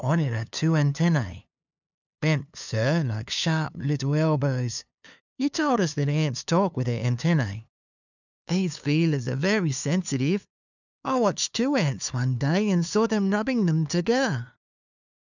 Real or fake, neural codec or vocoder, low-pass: fake; codec, 16 kHz, 2 kbps, FunCodec, trained on LibriTTS, 25 frames a second; 7.2 kHz